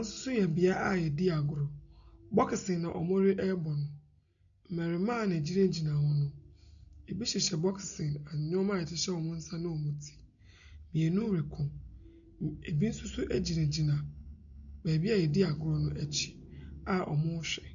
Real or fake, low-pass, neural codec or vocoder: real; 7.2 kHz; none